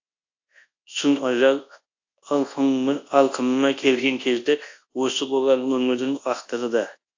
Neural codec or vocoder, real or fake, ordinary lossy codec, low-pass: codec, 24 kHz, 0.9 kbps, WavTokenizer, large speech release; fake; AAC, 48 kbps; 7.2 kHz